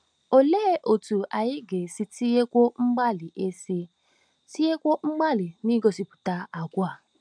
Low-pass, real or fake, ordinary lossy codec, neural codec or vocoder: 9.9 kHz; real; none; none